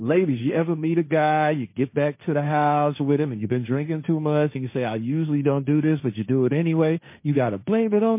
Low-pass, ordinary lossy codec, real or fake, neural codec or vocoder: 3.6 kHz; MP3, 24 kbps; fake; codec, 16 kHz, 1.1 kbps, Voila-Tokenizer